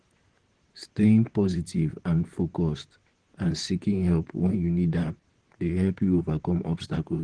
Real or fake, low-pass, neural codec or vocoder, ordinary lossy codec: fake; 9.9 kHz; vocoder, 44.1 kHz, 128 mel bands, Pupu-Vocoder; Opus, 16 kbps